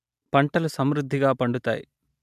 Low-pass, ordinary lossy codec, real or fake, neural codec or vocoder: 14.4 kHz; MP3, 96 kbps; real; none